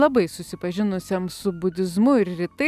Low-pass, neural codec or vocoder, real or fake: 14.4 kHz; none; real